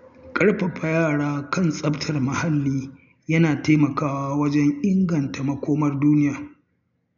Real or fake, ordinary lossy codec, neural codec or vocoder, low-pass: real; none; none; 7.2 kHz